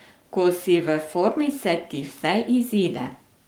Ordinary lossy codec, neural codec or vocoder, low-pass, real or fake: Opus, 24 kbps; codec, 44.1 kHz, 7.8 kbps, Pupu-Codec; 19.8 kHz; fake